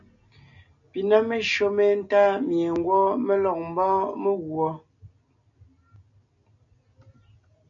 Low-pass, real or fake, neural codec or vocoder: 7.2 kHz; real; none